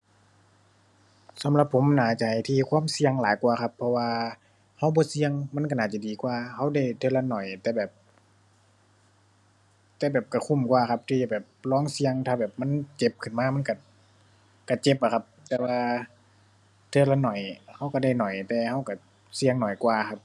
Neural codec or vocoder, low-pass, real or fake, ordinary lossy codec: none; none; real; none